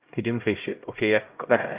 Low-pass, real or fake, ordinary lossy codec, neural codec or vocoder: 3.6 kHz; fake; Opus, 24 kbps; codec, 16 kHz, 0.5 kbps, X-Codec, HuBERT features, trained on LibriSpeech